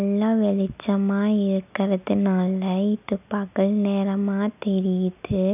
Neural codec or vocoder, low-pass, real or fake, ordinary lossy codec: none; 3.6 kHz; real; none